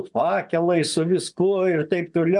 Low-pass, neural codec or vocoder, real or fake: 10.8 kHz; vocoder, 24 kHz, 100 mel bands, Vocos; fake